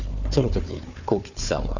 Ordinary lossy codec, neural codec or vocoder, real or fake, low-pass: none; codec, 16 kHz, 4 kbps, FunCodec, trained on Chinese and English, 50 frames a second; fake; 7.2 kHz